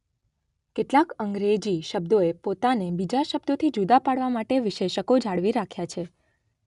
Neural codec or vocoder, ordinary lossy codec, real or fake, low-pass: none; none; real; 10.8 kHz